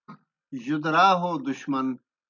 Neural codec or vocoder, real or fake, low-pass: none; real; 7.2 kHz